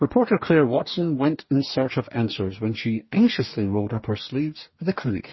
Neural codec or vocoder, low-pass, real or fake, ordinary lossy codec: codec, 44.1 kHz, 2.6 kbps, DAC; 7.2 kHz; fake; MP3, 24 kbps